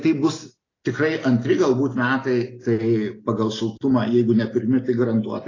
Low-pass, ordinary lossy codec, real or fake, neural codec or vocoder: 7.2 kHz; AAC, 32 kbps; real; none